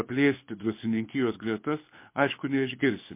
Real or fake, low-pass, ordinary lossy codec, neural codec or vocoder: fake; 3.6 kHz; MP3, 24 kbps; codec, 24 kHz, 6 kbps, HILCodec